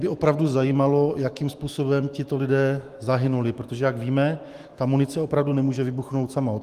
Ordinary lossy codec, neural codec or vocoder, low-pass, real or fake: Opus, 24 kbps; autoencoder, 48 kHz, 128 numbers a frame, DAC-VAE, trained on Japanese speech; 14.4 kHz; fake